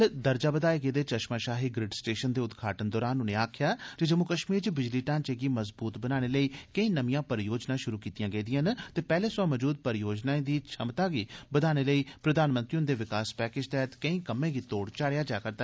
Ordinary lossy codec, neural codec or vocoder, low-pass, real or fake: none; none; none; real